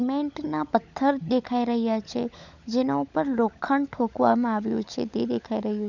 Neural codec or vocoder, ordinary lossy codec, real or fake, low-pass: codec, 16 kHz, 16 kbps, FunCodec, trained on LibriTTS, 50 frames a second; none; fake; 7.2 kHz